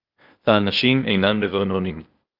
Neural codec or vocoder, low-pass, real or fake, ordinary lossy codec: codec, 16 kHz, 0.8 kbps, ZipCodec; 5.4 kHz; fake; Opus, 24 kbps